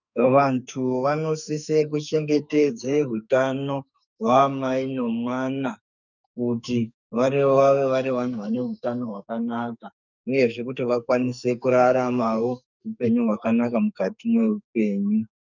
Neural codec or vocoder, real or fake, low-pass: codec, 44.1 kHz, 2.6 kbps, SNAC; fake; 7.2 kHz